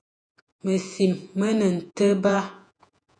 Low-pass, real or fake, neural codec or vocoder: 9.9 kHz; fake; vocoder, 48 kHz, 128 mel bands, Vocos